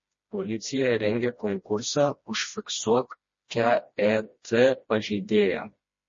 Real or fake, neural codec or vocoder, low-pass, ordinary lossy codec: fake; codec, 16 kHz, 1 kbps, FreqCodec, smaller model; 7.2 kHz; MP3, 32 kbps